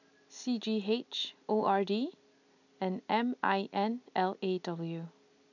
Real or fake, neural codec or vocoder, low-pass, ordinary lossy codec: real; none; 7.2 kHz; none